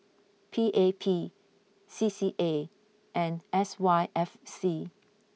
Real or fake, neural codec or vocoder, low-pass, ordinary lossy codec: real; none; none; none